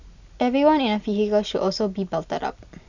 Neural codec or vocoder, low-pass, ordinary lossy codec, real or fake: none; 7.2 kHz; none; real